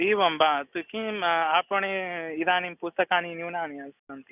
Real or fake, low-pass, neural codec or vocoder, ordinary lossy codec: real; 3.6 kHz; none; none